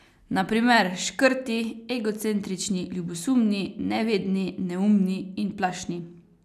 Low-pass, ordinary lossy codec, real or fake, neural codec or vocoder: 14.4 kHz; none; real; none